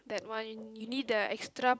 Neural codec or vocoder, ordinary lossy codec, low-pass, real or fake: none; none; none; real